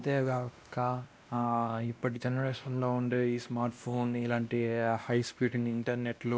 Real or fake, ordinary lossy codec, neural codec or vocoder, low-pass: fake; none; codec, 16 kHz, 1 kbps, X-Codec, WavLM features, trained on Multilingual LibriSpeech; none